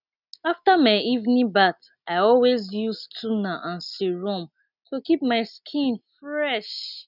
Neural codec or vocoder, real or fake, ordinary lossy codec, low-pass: none; real; none; 5.4 kHz